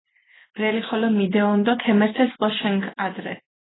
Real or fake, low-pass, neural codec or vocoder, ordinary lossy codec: real; 7.2 kHz; none; AAC, 16 kbps